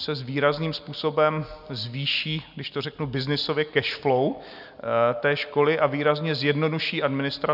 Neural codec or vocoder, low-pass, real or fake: none; 5.4 kHz; real